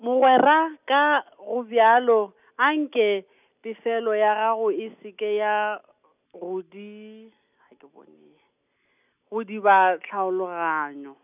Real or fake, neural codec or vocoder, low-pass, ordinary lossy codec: real; none; 3.6 kHz; none